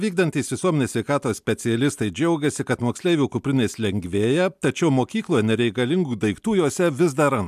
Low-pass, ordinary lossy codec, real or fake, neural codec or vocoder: 14.4 kHz; MP3, 96 kbps; real; none